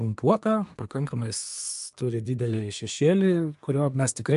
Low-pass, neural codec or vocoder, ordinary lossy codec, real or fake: 10.8 kHz; codec, 24 kHz, 1 kbps, SNAC; AAC, 96 kbps; fake